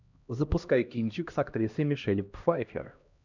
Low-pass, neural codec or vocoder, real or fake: 7.2 kHz; codec, 16 kHz, 1 kbps, X-Codec, HuBERT features, trained on LibriSpeech; fake